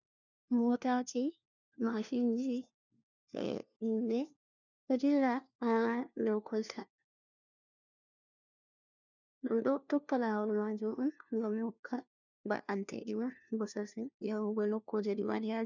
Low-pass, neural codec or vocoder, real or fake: 7.2 kHz; codec, 16 kHz, 1 kbps, FunCodec, trained on LibriTTS, 50 frames a second; fake